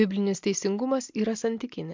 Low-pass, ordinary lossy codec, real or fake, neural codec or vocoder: 7.2 kHz; MP3, 64 kbps; real; none